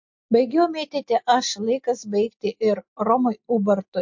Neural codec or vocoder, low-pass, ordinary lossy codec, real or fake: none; 7.2 kHz; MP3, 48 kbps; real